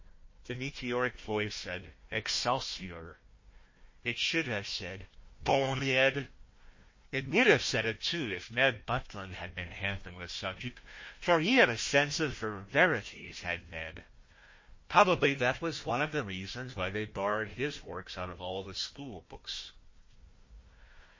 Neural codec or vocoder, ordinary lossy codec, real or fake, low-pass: codec, 16 kHz, 1 kbps, FunCodec, trained on Chinese and English, 50 frames a second; MP3, 32 kbps; fake; 7.2 kHz